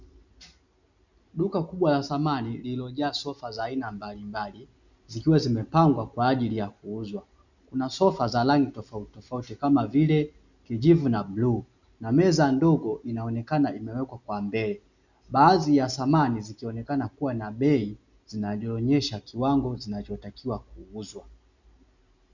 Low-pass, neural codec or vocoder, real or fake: 7.2 kHz; none; real